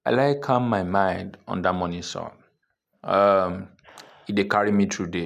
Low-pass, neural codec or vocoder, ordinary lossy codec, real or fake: 14.4 kHz; none; none; real